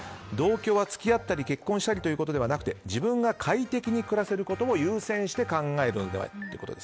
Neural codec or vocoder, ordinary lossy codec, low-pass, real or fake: none; none; none; real